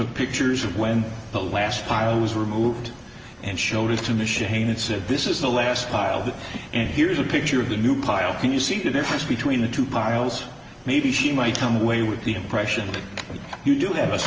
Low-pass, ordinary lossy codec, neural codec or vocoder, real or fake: 7.2 kHz; Opus, 24 kbps; none; real